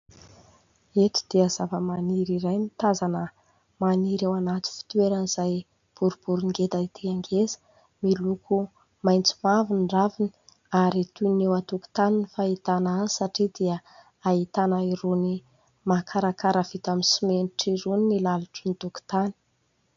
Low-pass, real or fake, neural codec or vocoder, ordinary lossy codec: 7.2 kHz; real; none; MP3, 64 kbps